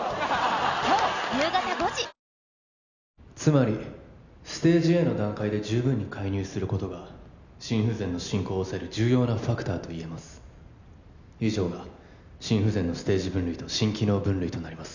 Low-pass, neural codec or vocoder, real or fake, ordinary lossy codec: 7.2 kHz; none; real; none